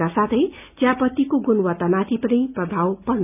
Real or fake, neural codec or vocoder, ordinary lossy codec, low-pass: real; none; none; 3.6 kHz